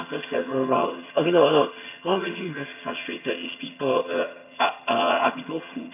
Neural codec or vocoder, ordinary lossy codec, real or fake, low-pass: vocoder, 22.05 kHz, 80 mel bands, HiFi-GAN; none; fake; 3.6 kHz